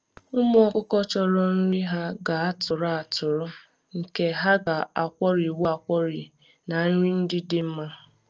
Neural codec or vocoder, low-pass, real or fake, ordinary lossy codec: none; 7.2 kHz; real; Opus, 24 kbps